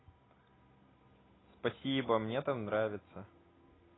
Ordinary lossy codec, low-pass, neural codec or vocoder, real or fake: AAC, 16 kbps; 7.2 kHz; none; real